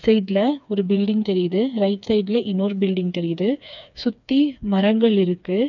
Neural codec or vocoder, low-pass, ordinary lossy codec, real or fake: codec, 16 kHz, 4 kbps, FreqCodec, smaller model; 7.2 kHz; none; fake